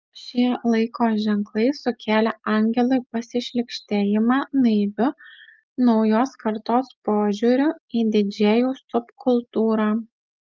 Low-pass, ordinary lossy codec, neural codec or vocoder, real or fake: 7.2 kHz; Opus, 24 kbps; none; real